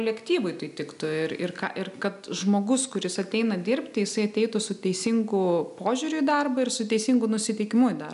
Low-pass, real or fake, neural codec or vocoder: 10.8 kHz; real; none